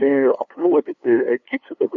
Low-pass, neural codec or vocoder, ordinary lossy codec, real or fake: 7.2 kHz; codec, 16 kHz, 2 kbps, FunCodec, trained on LibriTTS, 25 frames a second; MP3, 48 kbps; fake